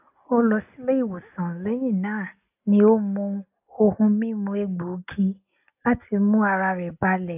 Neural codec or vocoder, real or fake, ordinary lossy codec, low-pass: none; real; AAC, 32 kbps; 3.6 kHz